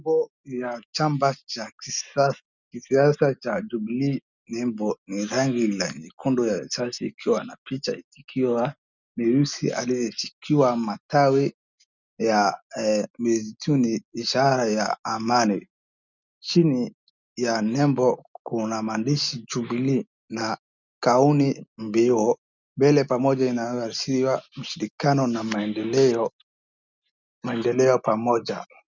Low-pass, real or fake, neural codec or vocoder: 7.2 kHz; real; none